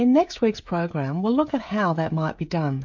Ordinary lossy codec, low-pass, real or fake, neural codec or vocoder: MP3, 48 kbps; 7.2 kHz; fake; codec, 16 kHz, 16 kbps, FreqCodec, smaller model